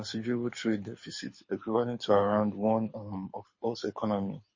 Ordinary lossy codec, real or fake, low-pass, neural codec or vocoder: MP3, 32 kbps; fake; 7.2 kHz; codec, 24 kHz, 6 kbps, HILCodec